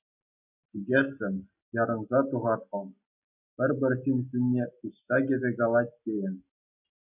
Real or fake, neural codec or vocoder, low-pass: real; none; 3.6 kHz